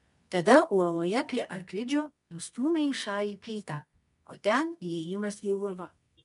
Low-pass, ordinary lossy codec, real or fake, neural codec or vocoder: 10.8 kHz; MP3, 64 kbps; fake; codec, 24 kHz, 0.9 kbps, WavTokenizer, medium music audio release